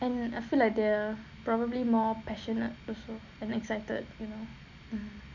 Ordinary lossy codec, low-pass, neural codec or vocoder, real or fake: none; 7.2 kHz; none; real